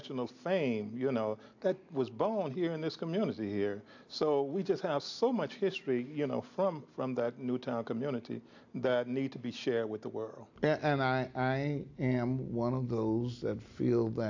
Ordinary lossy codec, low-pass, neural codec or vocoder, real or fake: AAC, 48 kbps; 7.2 kHz; none; real